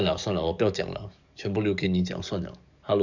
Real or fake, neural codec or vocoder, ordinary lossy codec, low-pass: fake; codec, 44.1 kHz, 7.8 kbps, DAC; none; 7.2 kHz